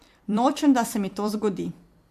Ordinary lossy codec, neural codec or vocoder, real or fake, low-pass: MP3, 64 kbps; vocoder, 44.1 kHz, 128 mel bands every 256 samples, BigVGAN v2; fake; 14.4 kHz